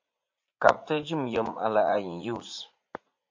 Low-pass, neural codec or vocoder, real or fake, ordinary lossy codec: 7.2 kHz; vocoder, 44.1 kHz, 80 mel bands, Vocos; fake; MP3, 48 kbps